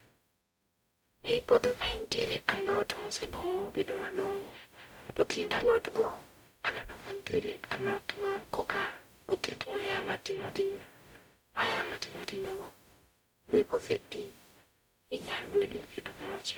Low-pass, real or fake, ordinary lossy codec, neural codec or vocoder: none; fake; none; codec, 44.1 kHz, 0.9 kbps, DAC